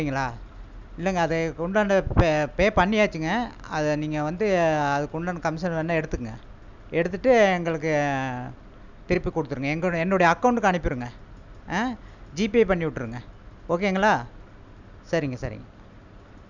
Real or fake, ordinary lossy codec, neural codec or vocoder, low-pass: real; none; none; 7.2 kHz